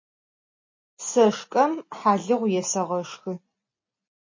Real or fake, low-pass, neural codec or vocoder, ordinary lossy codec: real; 7.2 kHz; none; MP3, 32 kbps